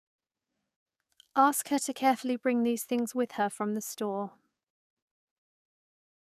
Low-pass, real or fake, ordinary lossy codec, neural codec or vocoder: 14.4 kHz; fake; none; codec, 44.1 kHz, 7.8 kbps, DAC